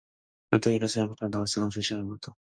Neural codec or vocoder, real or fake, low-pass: codec, 44.1 kHz, 2.6 kbps, DAC; fake; 9.9 kHz